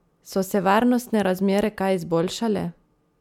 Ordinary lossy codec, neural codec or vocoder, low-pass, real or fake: MP3, 96 kbps; none; 19.8 kHz; real